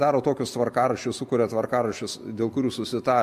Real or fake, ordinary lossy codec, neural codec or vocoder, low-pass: real; MP3, 64 kbps; none; 14.4 kHz